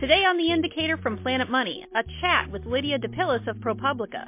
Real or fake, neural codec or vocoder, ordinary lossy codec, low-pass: real; none; MP3, 24 kbps; 3.6 kHz